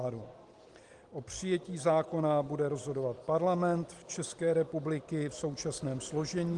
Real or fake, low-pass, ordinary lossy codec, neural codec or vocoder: real; 10.8 kHz; Opus, 24 kbps; none